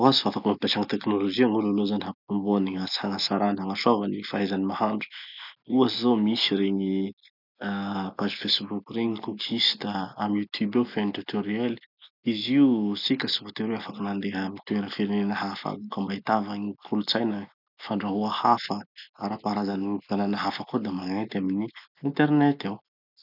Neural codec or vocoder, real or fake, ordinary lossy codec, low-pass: none; real; none; 5.4 kHz